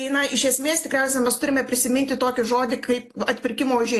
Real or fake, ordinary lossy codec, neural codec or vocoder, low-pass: real; AAC, 48 kbps; none; 14.4 kHz